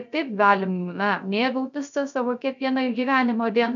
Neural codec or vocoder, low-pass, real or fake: codec, 16 kHz, 0.3 kbps, FocalCodec; 7.2 kHz; fake